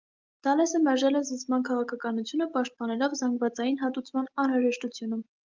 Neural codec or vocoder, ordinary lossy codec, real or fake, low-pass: none; Opus, 32 kbps; real; 7.2 kHz